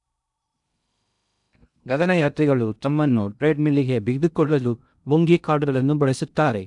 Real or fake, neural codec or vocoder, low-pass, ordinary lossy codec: fake; codec, 16 kHz in and 24 kHz out, 0.6 kbps, FocalCodec, streaming, 2048 codes; 10.8 kHz; none